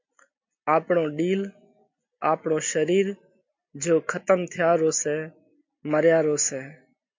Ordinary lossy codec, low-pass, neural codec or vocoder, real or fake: MP3, 48 kbps; 7.2 kHz; none; real